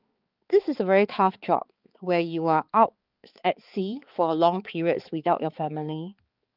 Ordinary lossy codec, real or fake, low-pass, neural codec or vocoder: Opus, 24 kbps; fake; 5.4 kHz; codec, 16 kHz, 4 kbps, X-Codec, HuBERT features, trained on balanced general audio